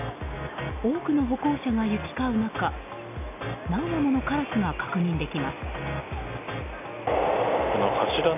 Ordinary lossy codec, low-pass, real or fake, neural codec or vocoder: none; 3.6 kHz; real; none